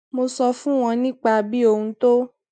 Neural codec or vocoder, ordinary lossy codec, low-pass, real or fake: none; MP3, 64 kbps; 9.9 kHz; real